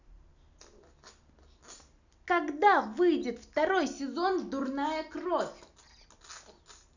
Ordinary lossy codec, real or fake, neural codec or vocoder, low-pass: none; real; none; 7.2 kHz